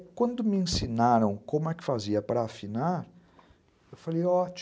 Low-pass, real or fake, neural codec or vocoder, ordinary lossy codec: none; real; none; none